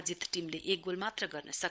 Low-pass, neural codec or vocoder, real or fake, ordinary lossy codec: none; codec, 16 kHz, 16 kbps, FunCodec, trained on LibriTTS, 50 frames a second; fake; none